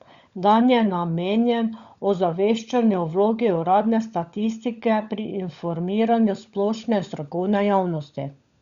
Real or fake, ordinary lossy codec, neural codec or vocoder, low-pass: fake; Opus, 64 kbps; codec, 16 kHz, 16 kbps, FunCodec, trained on LibriTTS, 50 frames a second; 7.2 kHz